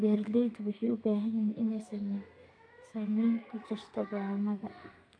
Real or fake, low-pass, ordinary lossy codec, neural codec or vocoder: fake; 9.9 kHz; none; codec, 32 kHz, 1.9 kbps, SNAC